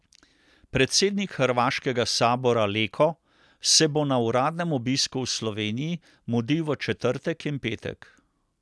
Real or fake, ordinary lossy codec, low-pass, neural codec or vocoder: real; none; none; none